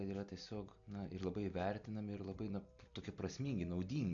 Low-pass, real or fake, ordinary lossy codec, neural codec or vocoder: 7.2 kHz; real; AAC, 64 kbps; none